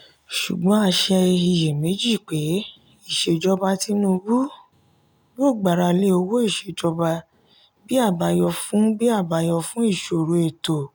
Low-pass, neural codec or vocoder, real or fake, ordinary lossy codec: none; none; real; none